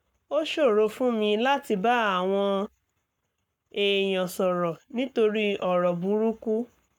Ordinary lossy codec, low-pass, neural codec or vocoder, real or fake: none; 19.8 kHz; codec, 44.1 kHz, 7.8 kbps, Pupu-Codec; fake